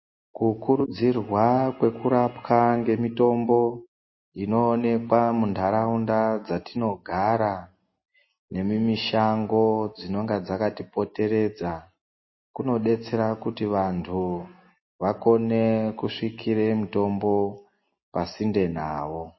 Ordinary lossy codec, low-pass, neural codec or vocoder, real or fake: MP3, 24 kbps; 7.2 kHz; none; real